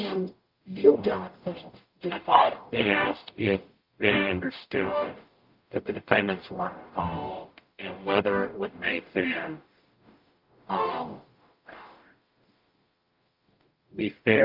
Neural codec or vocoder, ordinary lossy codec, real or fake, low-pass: codec, 44.1 kHz, 0.9 kbps, DAC; Opus, 24 kbps; fake; 5.4 kHz